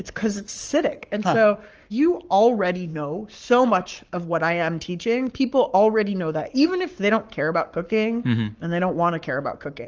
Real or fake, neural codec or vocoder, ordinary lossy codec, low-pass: fake; codec, 44.1 kHz, 7.8 kbps, Pupu-Codec; Opus, 24 kbps; 7.2 kHz